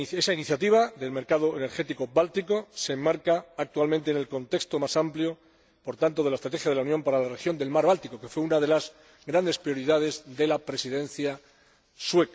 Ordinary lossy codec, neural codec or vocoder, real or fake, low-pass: none; none; real; none